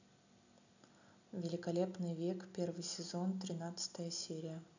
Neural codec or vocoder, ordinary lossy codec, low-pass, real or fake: none; none; 7.2 kHz; real